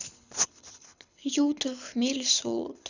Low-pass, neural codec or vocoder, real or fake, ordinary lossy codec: 7.2 kHz; codec, 24 kHz, 6 kbps, HILCodec; fake; none